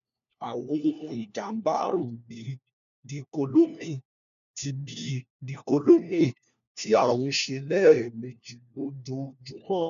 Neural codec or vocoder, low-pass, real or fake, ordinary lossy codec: codec, 16 kHz, 1 kbps, FunCodec, trained on LibriTTS, 50 frames a second; 7.2 kHz; fake; none